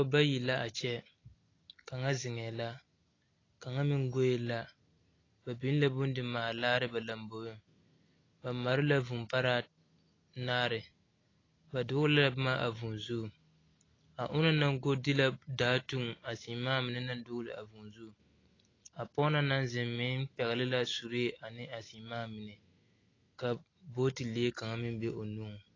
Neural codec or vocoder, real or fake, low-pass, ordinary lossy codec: none; real; 7.2 kHz; AAC, 32 kbps